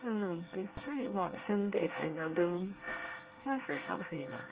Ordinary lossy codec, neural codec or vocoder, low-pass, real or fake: Opus, 24 kbps; codec, 24 kHz, 1 kbps, SNAC; 3.6 kHz; fake